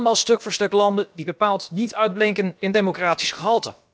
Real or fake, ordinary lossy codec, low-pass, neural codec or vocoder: fake; none; none; codec, 16 kHz, about 1 kbps, DyCAST, with the encoder's durations